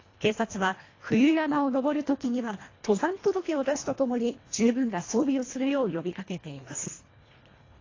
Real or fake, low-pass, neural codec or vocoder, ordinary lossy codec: fake; 7.2 kHz; codec, 24 kHz, 1.5 kbps, HILCodec; AAC, 32 kbps